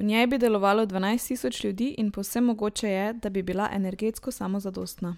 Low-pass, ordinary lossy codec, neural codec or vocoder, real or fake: 19.8 kHz; MP3, 96 kbps; none; real